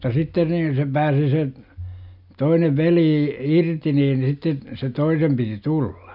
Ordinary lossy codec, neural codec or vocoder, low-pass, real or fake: none; none; 5.4 kHz; real